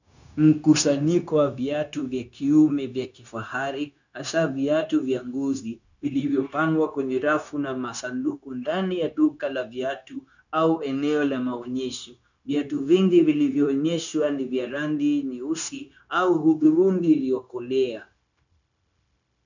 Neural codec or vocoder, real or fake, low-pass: codec, 16 kHz, 0.9 kbps, LongCat-Audio-Codec; fake; 7.2 kHz